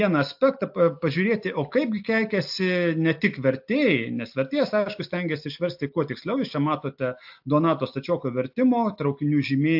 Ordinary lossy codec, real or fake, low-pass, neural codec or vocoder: AAC, 48 kbps; real; 5.4 kHz; none